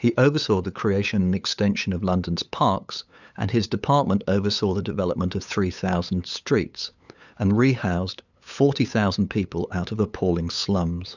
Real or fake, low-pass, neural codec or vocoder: fake; 7.2 kHz; codec, 16 kHz, 8 kbps, FunCodec, trained on LibriTTS, 25 frames a second